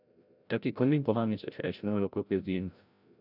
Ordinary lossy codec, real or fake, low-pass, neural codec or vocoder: none; fake; 5.4 kHz; codec, 16 kHz, 0.5 kbps, FreqCodec, larger model